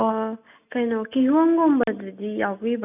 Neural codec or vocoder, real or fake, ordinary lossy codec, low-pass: none; real; none; 3.6 kHz